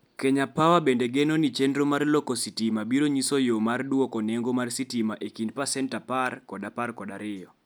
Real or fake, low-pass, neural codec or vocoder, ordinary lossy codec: real; none; none; none